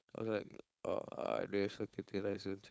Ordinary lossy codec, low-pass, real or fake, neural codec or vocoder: none; none; fake; codec, 16 kHz, 4.8 kbps, FACodec